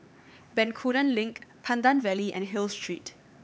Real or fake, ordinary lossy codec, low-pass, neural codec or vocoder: fake; none; none; codec, 16 kHz, 4 kbps, X-Codec, HuBERT features, trained on LibriSpeech